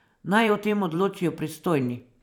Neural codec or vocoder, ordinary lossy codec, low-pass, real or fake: vocoder, 44.1 kHz, 128 mel bands every 512 samples, BigVGAN v2; none; 19.8 kHz; fake